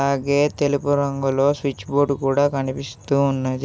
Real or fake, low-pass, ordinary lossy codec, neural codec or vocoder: real; none; none; none